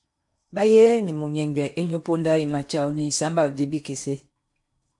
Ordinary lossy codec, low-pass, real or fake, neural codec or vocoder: MP3, 64 kbps; 10.8 kHz; fake; codec, 16 kHz in and 24 kHz out, 0.8 kbps, FocalCodec, streaming, 65536 codes